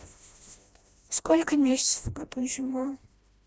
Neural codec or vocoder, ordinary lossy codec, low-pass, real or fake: codec, 16 kHz, 1 kbps, FreqCodec, smaller model; none; none; fake